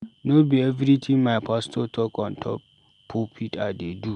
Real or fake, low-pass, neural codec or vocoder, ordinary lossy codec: real; 10.8 kHz; none; MP3, 96 kbps